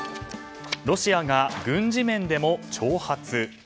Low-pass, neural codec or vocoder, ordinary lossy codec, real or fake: none; none; none; real